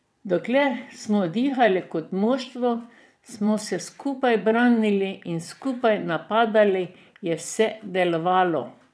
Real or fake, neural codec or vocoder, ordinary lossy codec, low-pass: fake; vocoder, 22.05 kHz, 80 mel bands, WaveNeXt; none; none